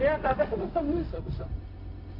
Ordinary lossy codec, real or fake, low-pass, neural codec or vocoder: AAC, 24 kbps; fake; 5.4 kHz; codec, 16 kHz, 0.4 kbps, LongCat-Audio-Codec